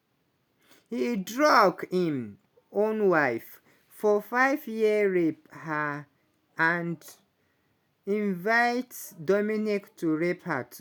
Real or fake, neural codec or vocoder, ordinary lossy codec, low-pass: real; none; none; none